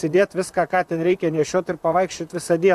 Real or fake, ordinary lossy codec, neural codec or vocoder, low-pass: fake; AAC, 96 kbps; vocoder, 44.1 kHz, 128 mel bands, Pupu-Vocoder; 14.4 kHz